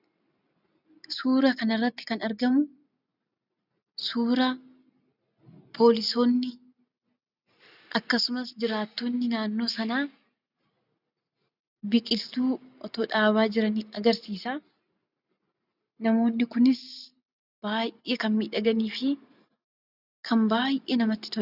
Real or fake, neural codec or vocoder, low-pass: real; none; 5.4 kHz